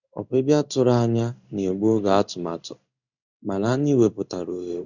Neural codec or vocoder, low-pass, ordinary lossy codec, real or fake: codec, 16 kHz in and 24 kHz out, 1 kbps, XY-Tokenizer; 7.2 kHz; none; fake